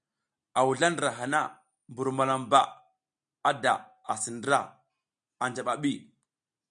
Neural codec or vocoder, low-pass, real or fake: none; 9.9 kHz; real